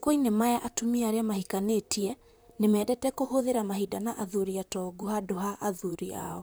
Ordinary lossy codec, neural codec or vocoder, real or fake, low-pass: none; vocoder, 44.1 kHz, 128 mel bands, Pupu-Vocoder; fake; none